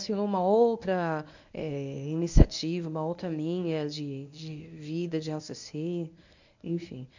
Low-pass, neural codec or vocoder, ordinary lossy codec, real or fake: 7.2 kHz; codec, 24 kHz, 0.9 kbps, WavTokenizer, medium speech release version 1; none; fake